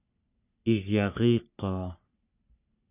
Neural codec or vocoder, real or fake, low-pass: codec, 44.1 kHz, 3.4 kbps, Pupu-Codec; fake; 3.6 kHz